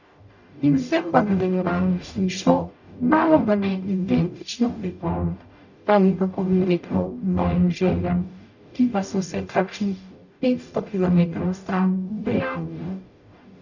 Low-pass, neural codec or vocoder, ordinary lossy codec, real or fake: 7.2 kHz; codec, 44.1 kHz, 0.9 kbps, DAC; none; fake